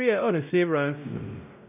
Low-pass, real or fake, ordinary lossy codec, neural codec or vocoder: 3.6 kHz; fake; none; codec, 16 kHz, 0.5 kbps, X-Codec, WavLM features, trained on Multilingual LibriSpeech